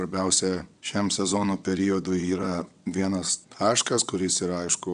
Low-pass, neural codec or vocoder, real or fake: 9.9 kHz; vocoder, 22.05 kHz, 80 mel bands, WaveNeXt; fake